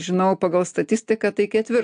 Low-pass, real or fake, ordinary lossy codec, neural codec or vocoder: 9.9 kHz; real; AAC, 64 kbps; none